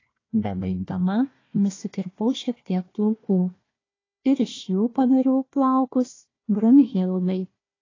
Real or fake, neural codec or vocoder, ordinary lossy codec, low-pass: fake; codec, 16 kHz, 1 kbps, FunCodec, trained on Chinese and English, 50 frames a second; AAC, 32 kbps; 7.2 kHz